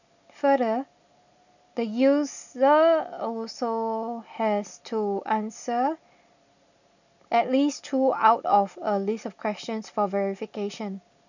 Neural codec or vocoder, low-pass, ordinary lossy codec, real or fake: none; 7.2 kHz; none; real